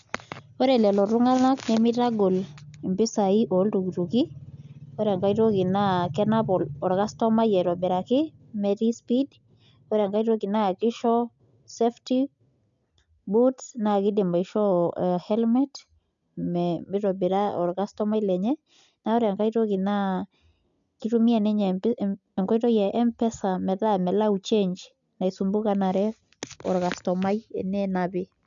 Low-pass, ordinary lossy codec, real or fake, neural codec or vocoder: 7.2 kHz; none; real; none